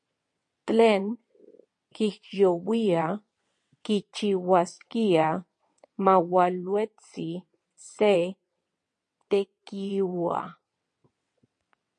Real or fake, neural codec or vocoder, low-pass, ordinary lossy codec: fake; vocoder, 22.05 kHz, 80 mel bands, WaveNeXt; 9.9 kHz; MP3, 48 kbps